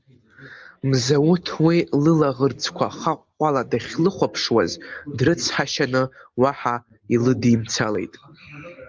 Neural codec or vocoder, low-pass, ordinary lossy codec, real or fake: none; 7.2 kHz; Opus, 32 kbps; real